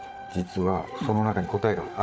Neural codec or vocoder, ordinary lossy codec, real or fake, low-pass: codec, 16 kHz, 8 kbps, FreqCodec, larger model; none; fake; none